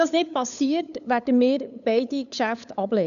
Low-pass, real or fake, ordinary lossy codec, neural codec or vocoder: 7.2 kHz; fake; none; codec, 16 kHz, 8 kbps, FunCodec, trained on LibriTTS, 25 frames a second